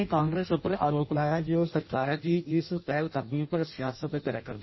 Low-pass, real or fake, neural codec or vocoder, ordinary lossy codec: 7.2 kHz; fake; codec, 16 kHz in and 24 kHz out, 0.6 kbps, FireRedTTS-2 codec; MP3, 24 kbps